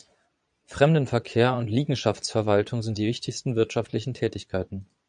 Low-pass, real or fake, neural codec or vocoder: 9.9 kHz; fake; vocoder, 22.05 kHz, 80 mel bands, Vocos